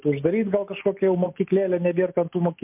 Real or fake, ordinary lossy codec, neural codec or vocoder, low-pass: real; MP3, 32 kbps; none; 3.6 kHz